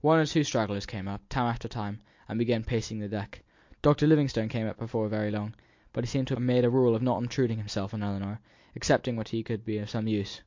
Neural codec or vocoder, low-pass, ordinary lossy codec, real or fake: none; 7.2 kHz; MP3, 48 kbps; real